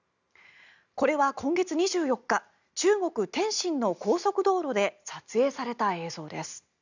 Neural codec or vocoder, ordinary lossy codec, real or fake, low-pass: none; none; real; 7.2 kHz